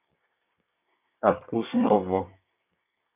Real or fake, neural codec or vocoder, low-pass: fake; codec, 24 kHz, 1 kbps, SNAC; 3.6 kHz